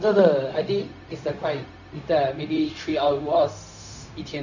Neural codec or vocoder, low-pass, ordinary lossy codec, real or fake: codec, 16 kHz, 0.4 kbps, LongCat-Audio-Codec; 7.2 kHz; none; fake